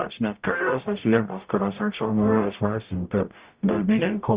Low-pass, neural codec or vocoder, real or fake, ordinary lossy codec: 3.6 kHz; codec, 44.1 kHz, 0.9 kbps, DAC; fake; Opus, 64 kbps